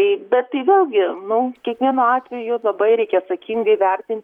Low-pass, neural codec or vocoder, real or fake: 19.8 kHz; vocoder, 44.1 kHz, 128 mel bands, Pupu-Vocoder; fake